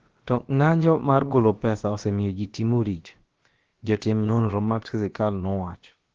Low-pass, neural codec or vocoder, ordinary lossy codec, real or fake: 7.2 kHz; codec, 16 kHz, about 1 kbps, DyCAST, with the encoder's durations; Opus, 16 kbps; fake